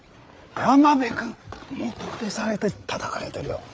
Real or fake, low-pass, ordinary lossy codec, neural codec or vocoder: fake; none; none; codec, 16 kHz, 8 kbps, FreqCodec, larger model